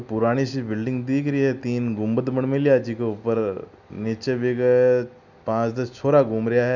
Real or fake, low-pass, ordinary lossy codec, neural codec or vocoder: real; 7.2 kHz; none; none